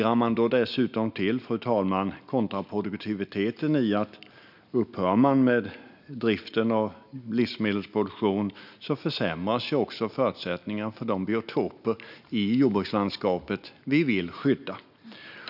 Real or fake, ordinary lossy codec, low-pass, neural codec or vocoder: real; none; 5.4 kHz; none